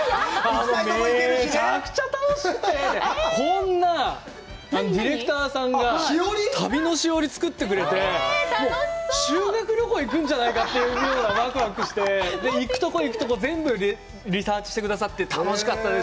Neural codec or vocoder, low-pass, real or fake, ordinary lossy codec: none; none; real; none